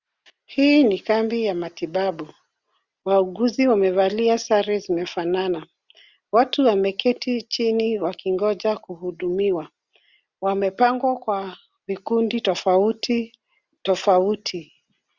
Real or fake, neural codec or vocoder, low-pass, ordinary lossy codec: real; none; 7.2 kHz; Opus, 64 kbps